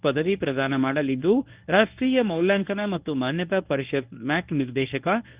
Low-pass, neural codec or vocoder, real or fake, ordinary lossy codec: 3.6 kHz; codec, 24 kHz, 0.9 kbps, WavTokenizer, medium speech release version 1; fake; Opus, 32 kbps